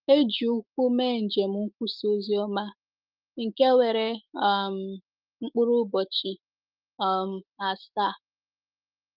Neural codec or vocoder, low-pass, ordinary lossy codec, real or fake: autoencoder, 48 kHz, 128 numbers a frame, DAC-VAE, trained on Japanese speech; 5.4 kHz; Opus, 24 kbps; fake